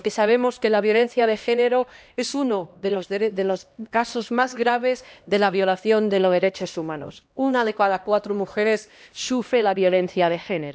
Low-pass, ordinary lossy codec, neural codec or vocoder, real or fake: none; none; codec, 16 kHz, 1 kbps, X-Codec, HuBERT features, trained on LibriSpeech; fake